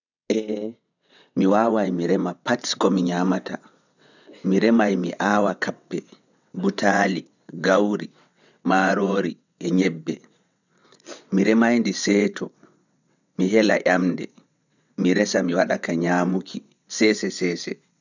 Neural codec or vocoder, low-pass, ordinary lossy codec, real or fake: vocoder, 22.05 kHz, 80 mel bands, WaveNeXt; 7.2 kHz; none; fake